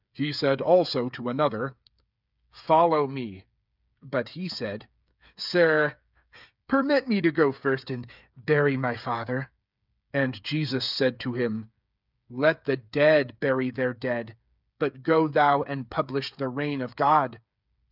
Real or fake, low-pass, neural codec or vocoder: fake; 5.4 kHz; codec, 16 kHz, 8 kbps, FreqCodec, smaller model